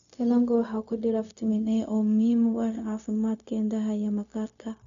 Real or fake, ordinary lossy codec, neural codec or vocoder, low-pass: fake; none; codec, 16 kHz, 0.4 kbps, LongCat-Audio-Codec; 7.2 kHz